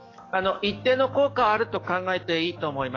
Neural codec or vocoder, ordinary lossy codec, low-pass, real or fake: codec, 44.1 kHz, 7.8 kbps, DAC; AAC, 32 kbps; 7.2 kHz; fake